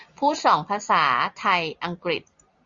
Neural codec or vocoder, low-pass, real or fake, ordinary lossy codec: none; 7.2 kHz; real; Opus, 64 kbps